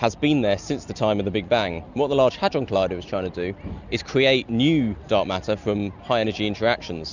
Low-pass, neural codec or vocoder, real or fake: 7.2 kHz; none; real